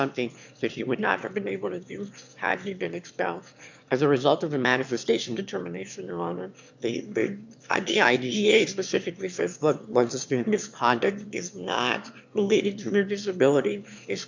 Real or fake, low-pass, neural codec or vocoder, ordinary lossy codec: fake; 7.2 kHz; autoencoder, 22.05 kHz, a latent of 192 numbers a frame, VITS, trained on one speaker; MP3, 64 kbps